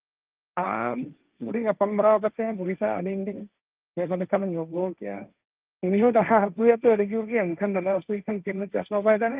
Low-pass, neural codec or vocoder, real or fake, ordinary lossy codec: 3.6 kHz; codec, 16 kHz, 1.1 kbps, Voila-Tokenizer; fake; Opus, 24 kbps